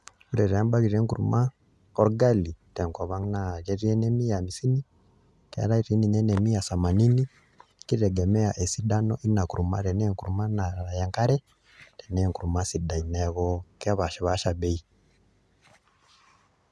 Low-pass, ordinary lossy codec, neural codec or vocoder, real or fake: none; none; none; real